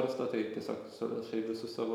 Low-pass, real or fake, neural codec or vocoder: 19.8 kHz; real; none